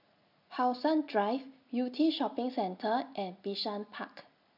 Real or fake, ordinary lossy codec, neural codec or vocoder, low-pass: real; MP3, 48 kbps; none; 5.4 kHz